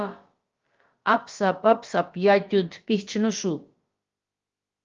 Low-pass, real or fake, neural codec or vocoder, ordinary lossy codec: 7.2 kHz; fake; codec, 16 kHz, about 1 kbps, DyCAST, with the encoder's durations; Opus, 32 kbps